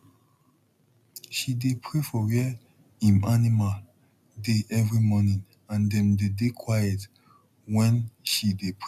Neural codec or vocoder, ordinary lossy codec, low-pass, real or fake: none; none; 14.4 kHz; real